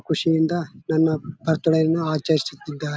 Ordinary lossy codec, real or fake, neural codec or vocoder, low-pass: none; real; none; none